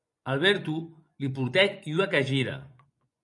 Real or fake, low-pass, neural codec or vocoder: fake; 10.8 kHz; vocoder, 24 kHz, 100 mel bands, Vocos